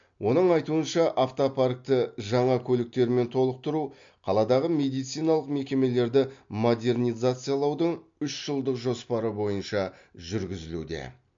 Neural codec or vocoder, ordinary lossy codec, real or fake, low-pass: none; MP3, 48 kbps; real; 7.2 kHz